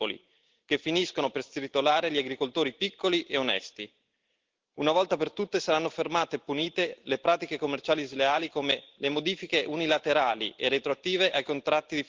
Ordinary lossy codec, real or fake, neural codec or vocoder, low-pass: Opus, 16 kbps; real; none; 7.2 kHz